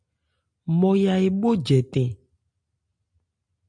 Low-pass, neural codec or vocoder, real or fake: 9.9 kHz; none; real